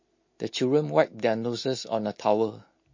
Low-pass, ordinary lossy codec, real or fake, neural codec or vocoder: 7.2 kHz; MP3, 32 kbps; real; none